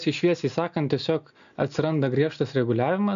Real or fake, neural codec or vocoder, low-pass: real; none; 7.2 kHz